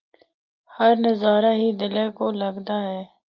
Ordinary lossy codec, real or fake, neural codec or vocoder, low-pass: Opus, 32 kbps; real; none; 7.2 kHz